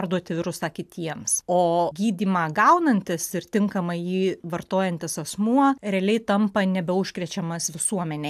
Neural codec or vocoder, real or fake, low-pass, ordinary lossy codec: none; real; 14.4 kHz; AAC, 96 kbps